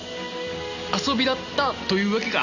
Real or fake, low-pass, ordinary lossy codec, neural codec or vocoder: real; 7.2 kHz; none; none